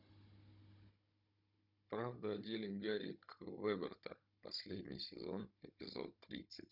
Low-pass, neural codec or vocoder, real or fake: 5.4 kHz; codec, 16 kHz, 16 kbps, FunCodec, trained on Chinese and English, 50 frames a second; fake